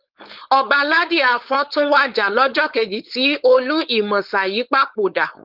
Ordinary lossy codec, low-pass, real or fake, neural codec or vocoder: Opus, 32 kbps; 5.4 kHz; fake; codec, 16 kHz, 4.8 kbps, FACodec